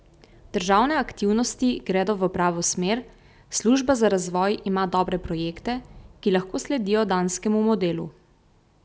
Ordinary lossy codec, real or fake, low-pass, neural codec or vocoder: none; real; none; none